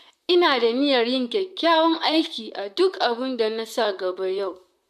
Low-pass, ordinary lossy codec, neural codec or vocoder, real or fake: 14.4 kHz; MP3, 96 kbps; vocoder, 44.1 kHz, 128 mel bands, Pupu-Vocoder; fake